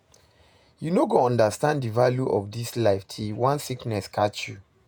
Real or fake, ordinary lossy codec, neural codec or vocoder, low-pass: fake; none; vocoder, 48 kHz, 128 mel bands, Vocos; none